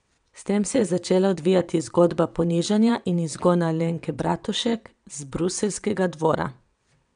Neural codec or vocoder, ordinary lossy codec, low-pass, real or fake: vocoder, 22.05 kHz, 80 mel bands, WaveNeXt; none; 9.9 kHz; fake